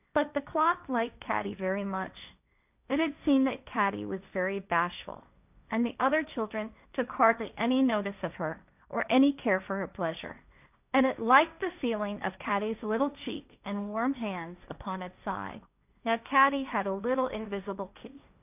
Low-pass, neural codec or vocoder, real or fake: 3.6 kHz; codec, 16 kHz, 1.1 kbps, Voila-Tokenizer; fake